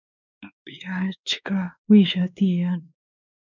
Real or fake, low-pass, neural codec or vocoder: fake; 7.2 kHz; codec, 16 kHz, 2 kbps, X-Codec, WavLM features, trained on Multilingual LibriSpeech